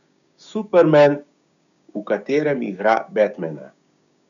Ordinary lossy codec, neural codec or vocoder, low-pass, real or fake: none; codec, 16 kHz, 6 kbps, DAC; 7.2 kHz; fake